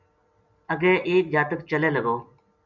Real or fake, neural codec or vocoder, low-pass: real; none; 7.2 kHz